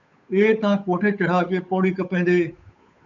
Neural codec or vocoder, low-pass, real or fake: codec, 16 kHz, 8 kbps, FunCodec, trained on Chinese and English, 25 frames a second; 7.2 kHz; fake